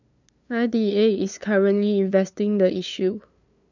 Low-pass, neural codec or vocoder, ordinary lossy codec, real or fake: 7.2 kHz; codec, 16 kHz, 2 kbps, FunCodec, trained on LibriTTS, 25 frames a second; none; fake